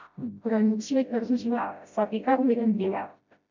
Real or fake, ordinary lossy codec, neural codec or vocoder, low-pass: fake; MP3, 64 kbps; codec, 16 kHz, 0.5 kbps, FreqCodec, smaller model; 7.2 kHz